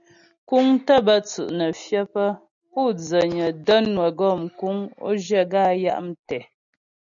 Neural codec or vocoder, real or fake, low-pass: none; real; 7.2 kHz